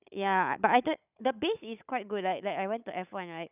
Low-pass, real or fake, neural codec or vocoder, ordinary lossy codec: 3.6 kHz; fake; codec, 16 kHz, 16 kbps, FunCodec, trained on Chinese and English, 50 frames a second; none